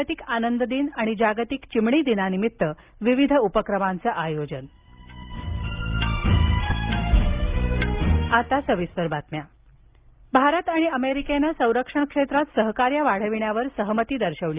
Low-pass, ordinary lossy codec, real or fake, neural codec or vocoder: 3.6 kHz; Opus, 24 kbps; real; none